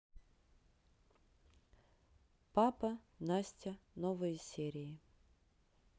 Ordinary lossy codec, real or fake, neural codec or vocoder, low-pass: none; real; none; none